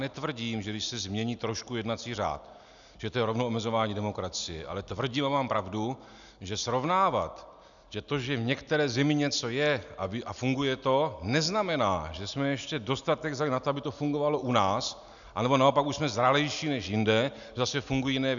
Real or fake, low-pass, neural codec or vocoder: real; 7.2 kHz; none